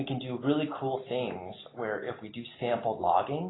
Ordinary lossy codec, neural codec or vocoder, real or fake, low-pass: AAC, 16 kbps; none; real; 7.2 kHz